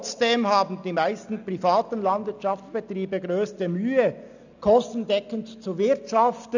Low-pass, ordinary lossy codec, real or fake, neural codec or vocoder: 7.2 kHz; none; real; none